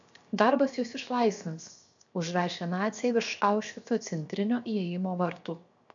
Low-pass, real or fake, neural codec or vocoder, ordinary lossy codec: 7.2 kHz; fake; codec, 16 kHz, 0.7 kbps, FocalCodec; MP3, 64 kbps